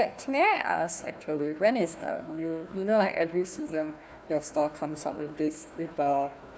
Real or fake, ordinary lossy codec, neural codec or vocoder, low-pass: fake; none; codec, 16 kHz, 1 kbps, FunCodec, trained on Chinese and English, 50 frames a second; none